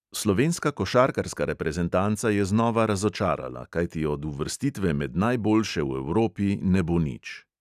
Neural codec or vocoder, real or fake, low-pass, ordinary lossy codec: none; real; 14.4 kHz; none